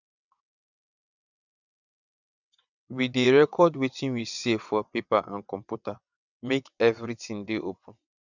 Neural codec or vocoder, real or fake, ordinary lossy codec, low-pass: vocoder, 22.05 kHz, 80 mel bands, WaveNeXt; fake; none; 7.2 kHz